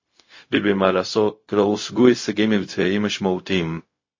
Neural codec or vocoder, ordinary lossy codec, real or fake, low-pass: codec, 16 kHz, 0.4 kbps, LongCat-Audio-Codec; MP3, 32 kbps; fake; 7.2 kHz